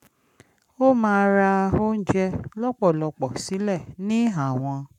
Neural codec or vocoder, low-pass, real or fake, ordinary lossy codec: codec, 44.1 kHz, 7.8 kbps, Pupu-Codec; 19.8 kHz; fake; none